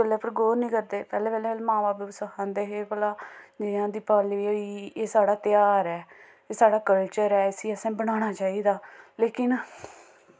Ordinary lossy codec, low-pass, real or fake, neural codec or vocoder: none; none; real; none